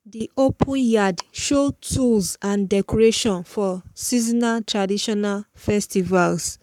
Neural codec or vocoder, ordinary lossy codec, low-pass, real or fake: codec, 44.1 kHz, 7.8 kbps, Pupu-Codec; none; 19.8 kHz; fake